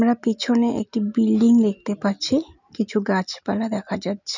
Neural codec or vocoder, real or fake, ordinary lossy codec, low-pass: none; real; none; 7.2 kHz